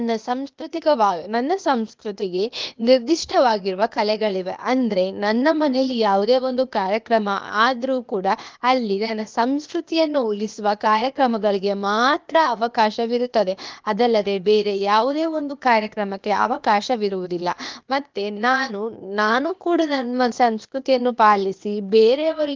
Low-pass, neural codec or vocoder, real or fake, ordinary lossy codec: 7.2 kHz; codec, 16 kHz, 0.8 kbps, ZipCodec; fake; Opus, 32 kbps